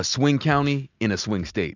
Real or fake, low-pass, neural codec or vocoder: real; 7.2 kHz; none